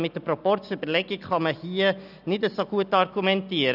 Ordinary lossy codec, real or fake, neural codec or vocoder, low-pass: none; real; none; 5.4 kHz